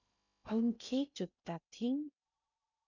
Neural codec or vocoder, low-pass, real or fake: codec, 16 kHz in and 24 kHz out, 0.6 kbps, FocalCodec, streaming, 2048 codes; 7.2 kHz; fake